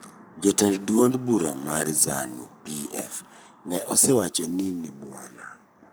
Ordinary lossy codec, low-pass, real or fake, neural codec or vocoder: none; none; fake; codec, 44.1 kHz, 3.4 kbps, Pupu-Codec